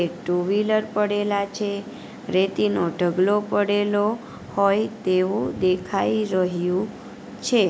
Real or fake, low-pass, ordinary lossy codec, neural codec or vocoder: real; none; none; none